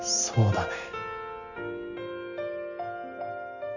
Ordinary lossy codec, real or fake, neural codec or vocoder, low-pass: none; real; none; 7.2 kHz